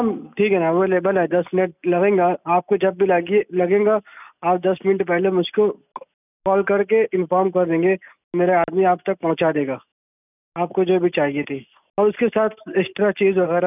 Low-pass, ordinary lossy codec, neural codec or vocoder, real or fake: 3.6 kHz; none; none; real